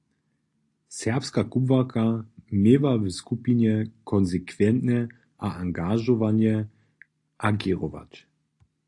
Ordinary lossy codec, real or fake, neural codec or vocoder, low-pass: AAC, 48 kbps; real; none; 10.8 kHz